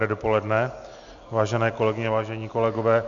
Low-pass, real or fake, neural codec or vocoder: 7.2 kHz; real; none